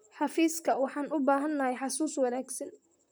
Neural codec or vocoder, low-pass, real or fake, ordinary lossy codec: vocoder, 44.1 kHz, 128 mel bands, Pupu-Vocoder; none; fake; none